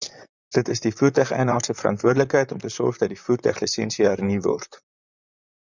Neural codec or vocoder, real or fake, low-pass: vocoder, 44.1 kHz, 128 mel bands, Pupu-Vocoder; fake; 7.2 kHz